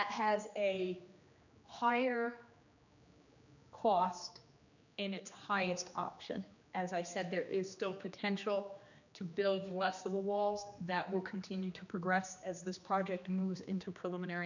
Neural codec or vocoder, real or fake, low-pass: codec, 16 kHz, 2 kbps, X-Codec, HuBERT features, trained on general audio; fake; 7.2 kHz